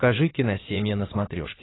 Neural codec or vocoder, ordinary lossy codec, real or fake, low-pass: codec, 16 kHz in and 24 kHz out, 2.2 kbps, FireRedTTS-2 codec; AAC, 16 kbps; fake; 7.2 kHz